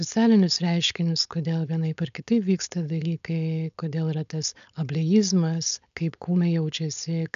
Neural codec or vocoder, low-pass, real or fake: codec, 16 kHz, 4.8 kbps, FACodec; 7.2 kHz; fake